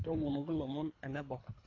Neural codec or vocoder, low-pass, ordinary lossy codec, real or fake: codec, 24 kHz, 3 kbps, HILCodec; 7.2 kHz; AAC, 32 kbps; fake